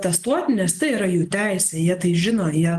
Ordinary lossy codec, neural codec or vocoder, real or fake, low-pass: Opus, 24 kbps; none; real; 14.4 kHz